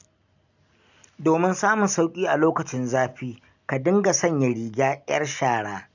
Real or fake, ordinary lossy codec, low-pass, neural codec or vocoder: real; none; 7.2 kHz; none